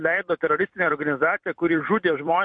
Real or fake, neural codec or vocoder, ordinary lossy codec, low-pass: fake; vocoder, 44.1 kHz, 128 mel bands every 256 samples, BigVGAN v2; MP3, 48 kbps; 9.9 kHz